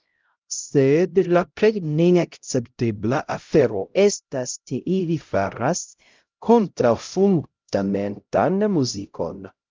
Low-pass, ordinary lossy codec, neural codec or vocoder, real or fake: 7.2 kHz; Opus, 24 kbps; codec, 16 kHz, 0.5 kbps, X-Codec, HuBERT features, trained on LibriSpeech; fake